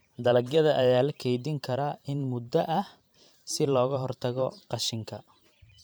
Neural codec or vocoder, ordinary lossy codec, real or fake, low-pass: vocoder, 44.1 kHz, 128 mel bands every 512 samples, BigVGAN v2; none; fake; none